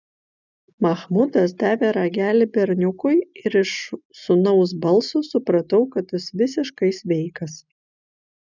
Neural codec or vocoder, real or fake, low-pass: none; real; 7.2 kHz